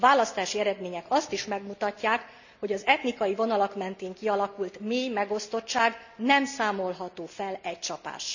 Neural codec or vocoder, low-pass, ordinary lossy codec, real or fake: none; 7.2 kHz; none; real